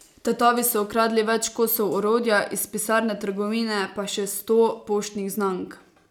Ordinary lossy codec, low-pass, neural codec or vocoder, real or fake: none; 19.8 kHz; none; real